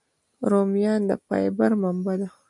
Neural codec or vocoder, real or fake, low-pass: none; real; 10.8 kHz